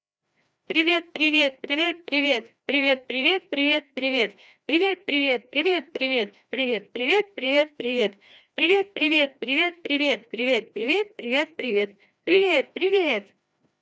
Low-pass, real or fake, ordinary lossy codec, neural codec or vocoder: none; fake; none; codec, 16 kHz, 1 kbps, FreqCodec, larger model